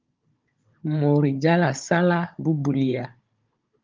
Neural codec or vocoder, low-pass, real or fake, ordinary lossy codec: codec, 16 kHz, 16 kbps, FunCodec, trained on Chinese and English, 50 frames a second; 7.2 kHz; fake; Opus, 24 kbps